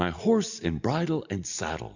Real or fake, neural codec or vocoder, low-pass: real; none; 7.2 kHz